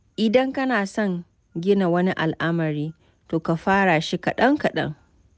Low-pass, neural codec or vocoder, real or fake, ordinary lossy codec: none; none; real; none